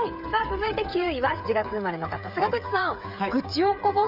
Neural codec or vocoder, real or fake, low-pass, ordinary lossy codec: codec, 16 kHz, 8 kbps, FreqCodec, smaller model; fake; 5.4 kHz; none